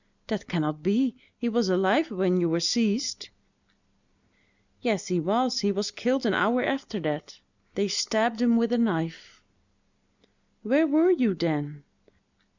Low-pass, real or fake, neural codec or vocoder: 7.2 kHz; real; none